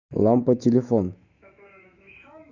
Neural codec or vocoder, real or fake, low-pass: autoencoder, 48 kHz, 128 numbers a frame, DAC-VAE, trained on Japanese speech; fake; 7.2 kHz